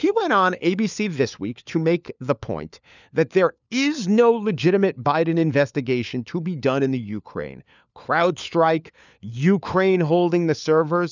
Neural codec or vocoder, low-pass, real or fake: codec, 16 kHz, 4 kbps, FunCodec, trained on LibriTTS, 50 frames a second; 7.2 kHz; fake